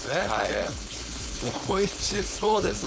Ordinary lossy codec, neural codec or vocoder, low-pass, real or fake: none; codec, 16 kHz, 4.8 kbps, FACodec; none; fake